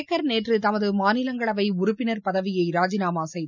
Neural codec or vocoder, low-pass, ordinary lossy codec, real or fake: none; 7.2 kHz; none; real